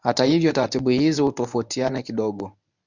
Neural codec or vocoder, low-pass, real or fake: codec, 24 kHz, 0.9 kbps, WavTokenizer, medium speech release version 1; 7.2 kHz; fake